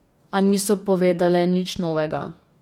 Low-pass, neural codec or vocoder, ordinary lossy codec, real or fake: 19.8 kHz; codec, 44.1 kHz, 2.6 kbps, DAC; MP3, 96 kbps; fake